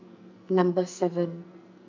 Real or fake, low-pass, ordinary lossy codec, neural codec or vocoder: fake; 7.2 kHz; MP3, 64 kbps; codec, 44.1 kHz, 2.6 kbps, SNAC